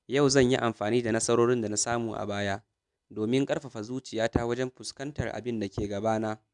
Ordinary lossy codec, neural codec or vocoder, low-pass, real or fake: none; none; 10.8 kHz; real